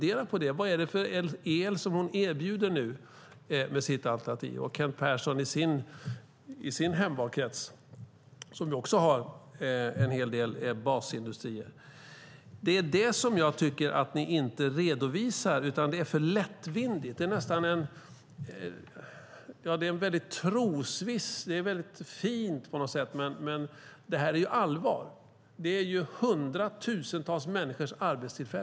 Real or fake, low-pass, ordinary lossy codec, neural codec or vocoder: real; none; none; none